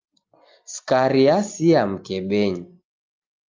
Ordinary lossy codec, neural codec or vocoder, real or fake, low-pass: Opus, 24 kbps; none; real; 7.2 kHz